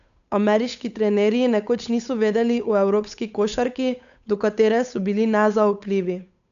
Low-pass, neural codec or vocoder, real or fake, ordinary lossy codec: 7.2 kHz; codec, 16 kHz, 8 kbps, FunCodec, trained on Chinese and English, 25 frames a second; fake; MP3, 96 kbps